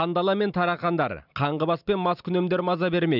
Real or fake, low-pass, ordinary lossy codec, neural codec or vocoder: real; 5.4 kHz; none; none